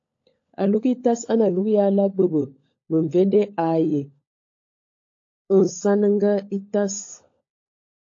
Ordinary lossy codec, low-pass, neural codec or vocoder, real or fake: AAC, 48 kbps; 7.2 kHz; codec, 16 kHz, 16 kbps, FunCodec, trained on LibriTTS, 50 frames a second; fake